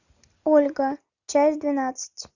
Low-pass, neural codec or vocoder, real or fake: 7.2 kHz; none; real